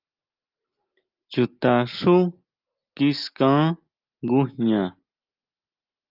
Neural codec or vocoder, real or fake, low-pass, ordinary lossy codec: none; real; 5.4 kHz; Opus, 24 kbps